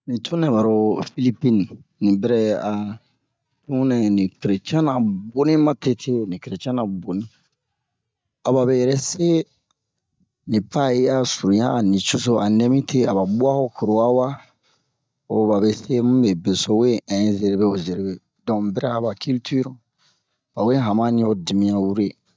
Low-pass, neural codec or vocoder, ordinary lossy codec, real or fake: none; none; none; real